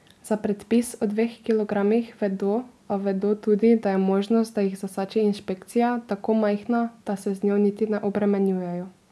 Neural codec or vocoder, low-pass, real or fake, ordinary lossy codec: none; none; real; none